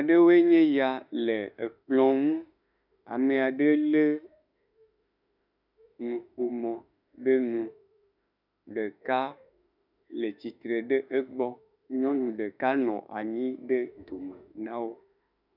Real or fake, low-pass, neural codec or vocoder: fake; 5.4 kHz; autoencoder, 48 kHz, 32 numbers a frame, DAC-VAE, trained on Japanese speech